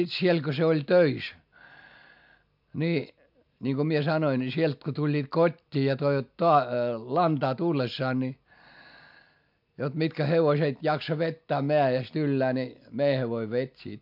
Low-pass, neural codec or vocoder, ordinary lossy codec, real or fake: 5.4 kHz; none; MP3, 48 kbps; real